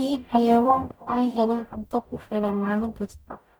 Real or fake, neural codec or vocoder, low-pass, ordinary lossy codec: fake; codec, 44.1 kHz, 0.9 kbps, DAC; none; none